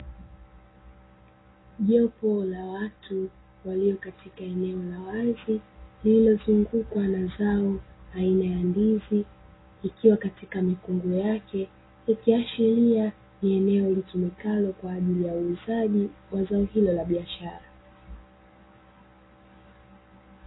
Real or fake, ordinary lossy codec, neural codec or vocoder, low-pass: real; AAC, 16 kbps; none; 7.2 kHz